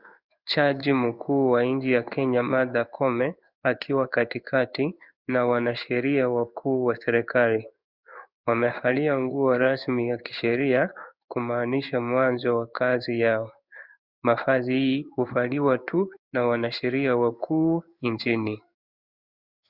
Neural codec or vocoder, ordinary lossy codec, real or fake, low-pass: codec, 16 kHz in and 24 kHz out, 1 kbps, XY-Tokenizer; Opus, 64 kbps; fake; 5.4 kHz